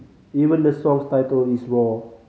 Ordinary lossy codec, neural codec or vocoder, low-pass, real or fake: none; none; none; real